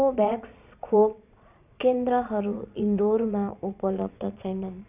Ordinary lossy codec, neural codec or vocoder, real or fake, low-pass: none; vocoder, 22.05 kHz, 80 mel bands, Vocos; fake; 3.6 kHz